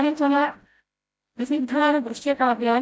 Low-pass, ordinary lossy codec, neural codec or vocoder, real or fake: none; none; codec, 16 kHz, 0.5 kbps, FreqCodec, smaller model; fake